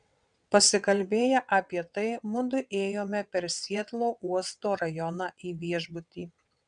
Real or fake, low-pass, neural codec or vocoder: fake; 9.9 kHz; vocoder, 22.05 kHz, 80 mel bands, WaveNeXt